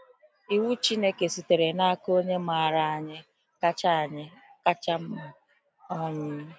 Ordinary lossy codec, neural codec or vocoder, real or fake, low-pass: none; none; real; none